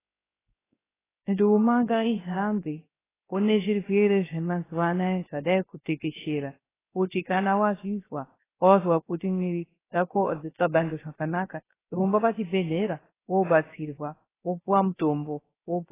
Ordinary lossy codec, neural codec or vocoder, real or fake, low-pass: AAC, 16 kbps; codec, 16 kHz, 0.3 kbps, FocalCodec; fake; 3.6 kHz